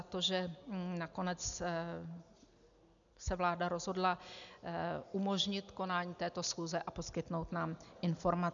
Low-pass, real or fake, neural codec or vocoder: 7.2 kHz; real; none